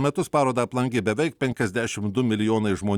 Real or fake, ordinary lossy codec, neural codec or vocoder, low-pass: real; Opus, 64 kbps; none; 19.8 kHz